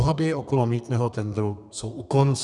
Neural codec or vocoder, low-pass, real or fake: codec, 32 kHz, 1.9 kbps, SNAC; 10.8 kHz; fake